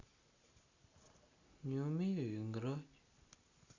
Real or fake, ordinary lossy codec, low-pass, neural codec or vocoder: real; Opus, 64 kbps; 7.2 kHz; none